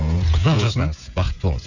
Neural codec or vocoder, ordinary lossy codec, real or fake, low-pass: codec, 16 kHz, 16 kbps, FreqCodec, smaller model; none; fake; 7.2 kHz